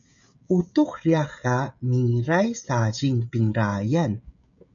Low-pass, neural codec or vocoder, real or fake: 7.2 kHz; codec, 16 kHz, 16 kbps, FreqCodec, smaller model; fake